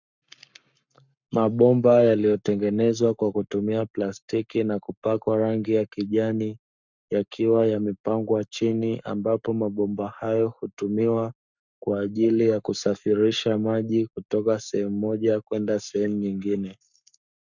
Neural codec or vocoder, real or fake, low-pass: codec, 44.1 kHz, 7.8 kbps, Pupu-Codec; fake; 7.2 kHz